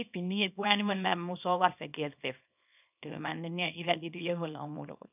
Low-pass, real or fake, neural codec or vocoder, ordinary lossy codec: 3.6 kHz; fake; codec, 24 kHz, 0.9 kbps, WavTokenizer, small release; none